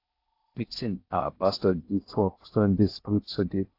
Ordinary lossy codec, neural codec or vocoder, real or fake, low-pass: AAC, 32 kbps; codec, 16 kHz in and 24 kHz out, 0.6 kbps, FocalCodec, streaming, 4096 codes; fake; 5.4 kHz